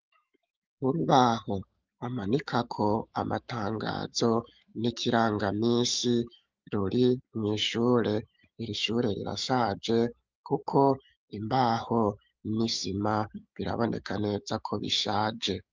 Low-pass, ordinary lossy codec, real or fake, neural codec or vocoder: 7.2 kHz; Opus, 24 kbps; fake; autoencoder, 48 kHz, 128 numbers a frame, DAC-VAE, trained on Japanese speech